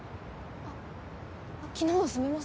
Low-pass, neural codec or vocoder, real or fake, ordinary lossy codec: none; none; real; none